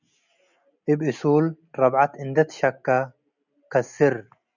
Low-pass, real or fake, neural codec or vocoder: 7.2 kHz; real; none